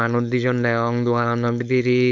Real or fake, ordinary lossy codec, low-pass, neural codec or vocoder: fake; none; 7.2 kHz; codec, 16 kHz, 4.8 kbps, FACodec